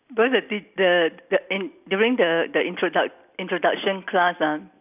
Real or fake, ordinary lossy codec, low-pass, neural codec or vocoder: real; none; 3.6 kHz; none